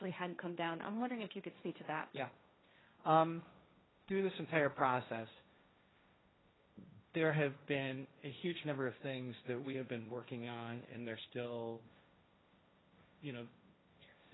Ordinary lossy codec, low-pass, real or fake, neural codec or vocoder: AAC, 16 kbps; 7.2 kHz; fake; codec, 16 kHz, 1.1 kbps, Voila-Tokenizer